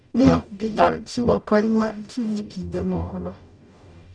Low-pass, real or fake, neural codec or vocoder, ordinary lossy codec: 9.9 kHz; fake; codec, 44.1 kHz, 0.9 kbps, DAC; none